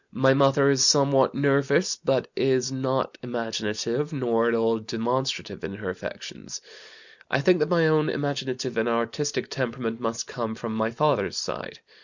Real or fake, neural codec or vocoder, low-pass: real; none; 7.2 kHz